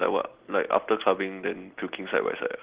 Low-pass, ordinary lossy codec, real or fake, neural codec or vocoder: 3.6 kHz; Opus, 16 kbps; real; none